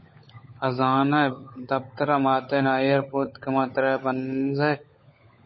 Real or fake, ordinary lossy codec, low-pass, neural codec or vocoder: fake; MP3, 24 kbps; 7.2 kHz; codec, 16 kHz, 16 kbps, FunCodec, trained on LibriTTS, 50 frames a second